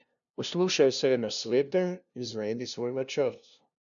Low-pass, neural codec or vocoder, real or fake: 7.2 kHz; codec, 16 kHz, 0.5 kbps, FunCodec, trained on LibriTTS, 25 frames a second; fake